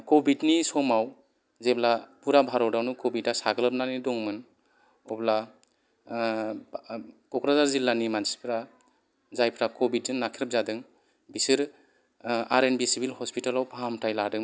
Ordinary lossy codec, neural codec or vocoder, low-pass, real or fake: none; none; none; real